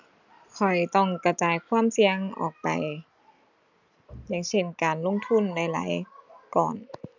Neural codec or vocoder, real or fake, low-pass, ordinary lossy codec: none; real; 7.2 kHz; none